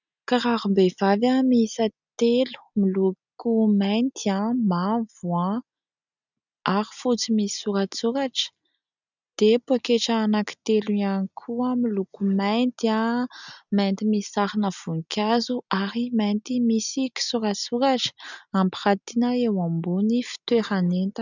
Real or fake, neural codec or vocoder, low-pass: real; none; 7.2 kHz